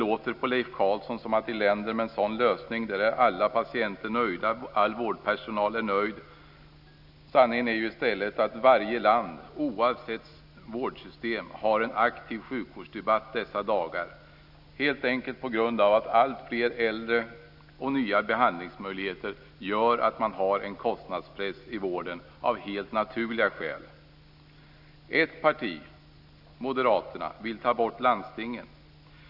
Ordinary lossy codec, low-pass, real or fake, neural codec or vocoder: none; 5.4 kHz; real; none